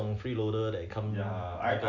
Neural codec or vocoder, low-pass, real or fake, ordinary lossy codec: none; 7.2 kHz; real; none